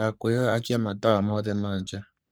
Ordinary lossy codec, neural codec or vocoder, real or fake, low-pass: none; codec, 44.1 kHz, 3.4 kbps, Pupu-Codec; fake; none